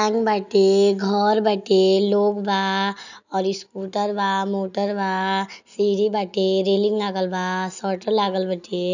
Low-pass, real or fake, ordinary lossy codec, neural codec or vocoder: 7.2 kHz; real; none; none